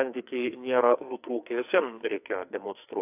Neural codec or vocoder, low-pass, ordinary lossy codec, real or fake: codec, 44.1 kHz, 2.6 kbps, SNAC; 3.6 kHz; AAC, 32 kbps; fake